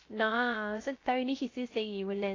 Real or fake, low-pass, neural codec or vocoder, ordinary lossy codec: fake; 7.2 kHz; codec, 16 kHz, 0.3 kbps, FocalCodec; AAC, 32 kbps